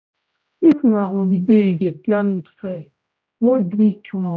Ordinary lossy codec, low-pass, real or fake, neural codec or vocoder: none; none; fake; codec, 16 kHz, 0.5 kbps, X-Codec, HuBERT features, trained on general audio